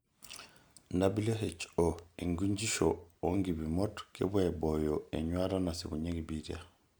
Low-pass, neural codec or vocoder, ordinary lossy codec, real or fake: none; none; none; real